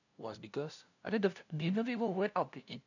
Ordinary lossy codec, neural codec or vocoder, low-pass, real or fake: none; codec, 16 kHz, 0.5 kbps, FunCodec, trained on LibriTTS, 25 frames a second; 7.2 kHz; fake